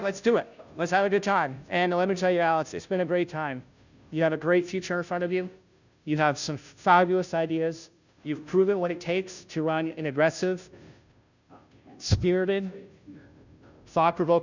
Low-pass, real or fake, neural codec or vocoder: 7.2 kHz; fake; codec, 16 kHz, 0.5 kbps, FunCodec, trained on Chinese and English, 25 frames a second